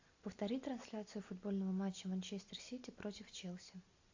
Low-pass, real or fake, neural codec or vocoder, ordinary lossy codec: 7.2 kHz; real; none; AAC, 48 kbps